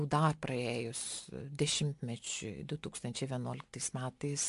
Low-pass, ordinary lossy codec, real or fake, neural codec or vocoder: 10.8 kHz; AAC, 64 kbps; real; none